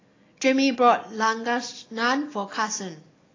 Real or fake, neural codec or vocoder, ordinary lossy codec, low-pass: real; none; AAC, 32 kbps; 7.2 kHz